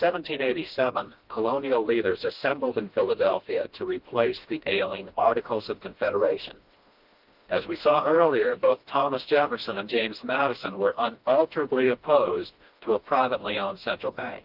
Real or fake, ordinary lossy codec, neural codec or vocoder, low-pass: fake; Opus, 24 kbps; codec, 16 kHz, 1 kbps, FreqCodec, smaller model; 5.4 kHz